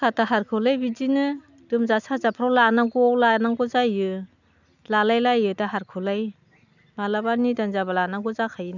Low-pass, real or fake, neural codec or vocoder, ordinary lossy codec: 7.2 kHz; real; none; none